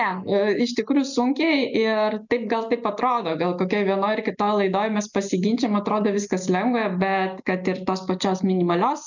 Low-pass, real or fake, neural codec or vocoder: 7.2 kHz; real; none